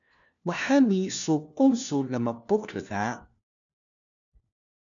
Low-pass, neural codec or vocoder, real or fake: 7.2 kHz; codec, 16 kHz, 1 kbps, FunCodec, trained on LibriTTS, 50 frames a second; fake